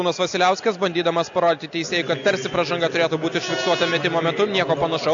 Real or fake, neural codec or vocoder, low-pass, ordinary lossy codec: real; none; 7.2 kHz; AAC, 64 kbps